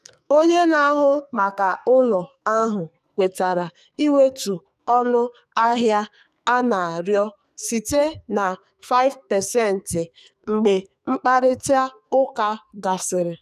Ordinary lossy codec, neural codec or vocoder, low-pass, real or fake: none; codec, 44.1 kHz, 2.6 kbps, SNAC; 14.4 kHz; fake